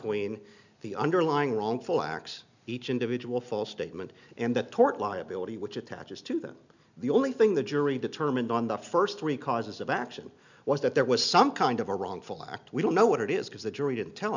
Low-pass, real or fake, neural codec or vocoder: 7.2 kHz; real; none